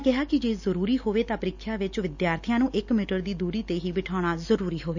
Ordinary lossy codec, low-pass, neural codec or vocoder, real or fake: none; 7.2 kHz; none; real